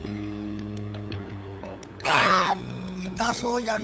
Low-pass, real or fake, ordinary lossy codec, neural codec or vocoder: none; fake; none; codec, 16 kHz, 8 kbps, FunCodec, trained on LibriTTS, 25 frames a second